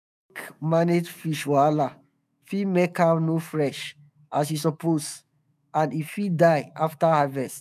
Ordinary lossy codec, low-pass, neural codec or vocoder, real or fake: none; 14.4 kHz; autoencoder, 48 kHz, 128 numbers a frame, DAC-VAE, trained on Japanese speech; fake